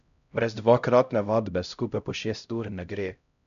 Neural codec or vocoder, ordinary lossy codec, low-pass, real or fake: codec, 16 kHz, 0.5 kbps, X-Codec, HuBERT features, trained on LibriSpeech; none; 7.2 kHz; fake